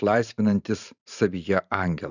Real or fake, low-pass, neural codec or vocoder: fake; 7.2 kHz; vocoder, 44.1 kHz, 128 mel bands every 512 samples, BigVGAN v2